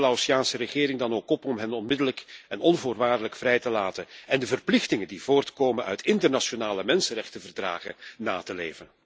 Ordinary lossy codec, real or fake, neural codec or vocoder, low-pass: none; real; none; none